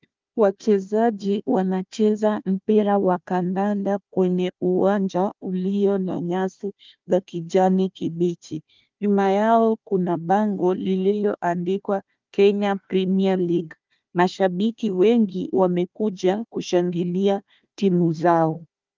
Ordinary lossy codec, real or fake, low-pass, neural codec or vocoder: Opus, 32 kbps; fake; 7.2 kHz; codec, 16 kHz, 1 kbps, FunCodec, trained on Chinese and English, 50 frames a second